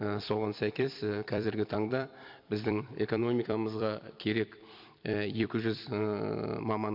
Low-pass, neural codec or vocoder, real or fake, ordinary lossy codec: 5.4 kHz; vocoder, 44.1 kHz, 128 mel bands every 256 samples, BigVGAN v2; fake; MP3, 48 kbps